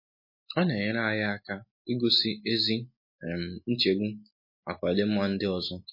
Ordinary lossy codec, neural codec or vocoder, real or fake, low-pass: MP3, 24 kbps; none; real; 5.4 kHz